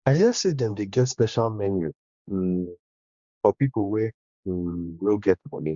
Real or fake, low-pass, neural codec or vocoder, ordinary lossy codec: fake; 7.2 kHz; codec, 16 kHz, 2 kbps, X-Codec, HuBERT features, trained on general audio; Opus, 64 kbps